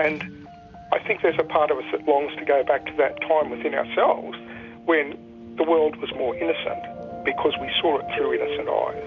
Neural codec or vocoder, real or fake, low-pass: none; real; 7.2 kHz